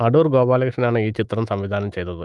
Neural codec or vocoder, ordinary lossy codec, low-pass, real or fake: autoencoder, 48 kHz, 128 numbers a frame, DAC-VAE, trained on Japanese speech; Opus, 32 kbps; 10.8 kHz; fake